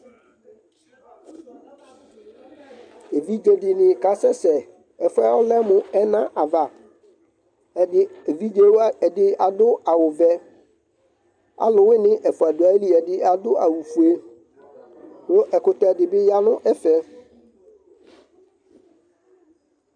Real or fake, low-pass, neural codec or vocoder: real; 9.9 kHz; none